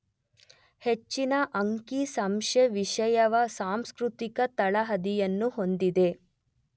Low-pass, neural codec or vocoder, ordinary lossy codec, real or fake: none; none; none; real